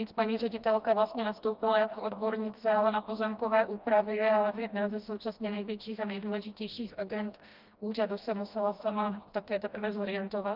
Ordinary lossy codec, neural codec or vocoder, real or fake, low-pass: Opus, 24 kbps; codec, 16 kHz, 1 kbps, FreqCodec, smaller model; fake; 5.4 kHz